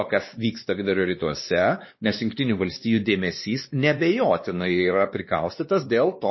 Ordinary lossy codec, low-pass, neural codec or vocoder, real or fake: MP3, 24 kbps; 7.2 kHz; codec, 16 kHz, 2 kbps, X-Codec, WavLM features, trained on Multilingual LibriSpeech; fake